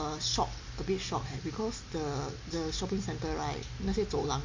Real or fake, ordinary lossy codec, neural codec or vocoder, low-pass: real; MP3, 48 kbps; none; 7.2 kHz